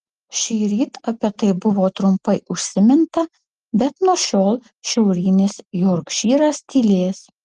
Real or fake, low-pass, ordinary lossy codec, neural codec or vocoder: real; 10.8 kHz; Opus, 24 kbps; none